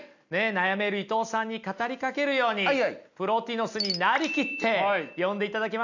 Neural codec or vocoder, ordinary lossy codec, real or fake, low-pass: none; none; real; 7.2 kHz